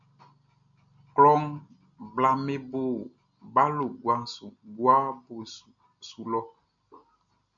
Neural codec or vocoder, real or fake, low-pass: none; real; 7.2 kHz